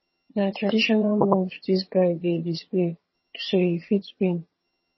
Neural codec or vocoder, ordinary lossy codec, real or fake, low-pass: vocoder, 22.05 kHz, 80 mel bands, HiFi-GAN; MP3, 24 kbps; fake; 7.2 kHz